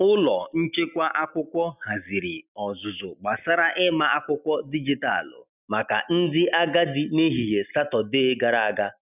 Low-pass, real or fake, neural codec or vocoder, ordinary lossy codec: 3.6 kHz; real; none; none